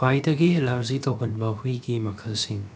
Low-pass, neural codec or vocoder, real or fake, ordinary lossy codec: none; codec, 16 kHz, about 1 kbps, DyCAST, with the encoder's durations; fake; none